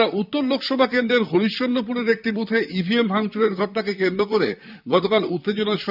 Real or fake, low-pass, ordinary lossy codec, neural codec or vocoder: fake; 5.4 kHz; none; vocoder, 44.1 kHz, 128 mel bands, Pupu-Vocoder